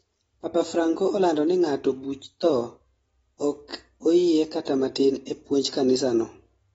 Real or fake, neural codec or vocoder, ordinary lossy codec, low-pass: real; none; AAC, 24 kbps; 19.8 kHz